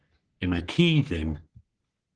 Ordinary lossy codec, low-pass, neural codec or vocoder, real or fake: Opus, 16 kbps; 9.9 kHz; codec, 44.1 kHz, 3.4 kbps, Pupu-Codec; fake